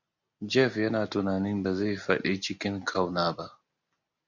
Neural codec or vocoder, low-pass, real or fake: none; 7.2 kHz; real